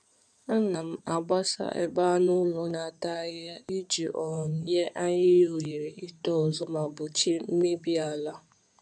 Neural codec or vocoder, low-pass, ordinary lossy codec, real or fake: codec, 16 kHz in and 24 kHz out, 2.2 kbps, FireRedTTS-2 codec; 9.9 kHz; none; fake